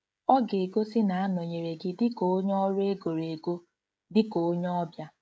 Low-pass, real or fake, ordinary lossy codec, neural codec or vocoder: none; fake; none; codec, 16 kHz, 16 kbps, FreqCodec, smaller model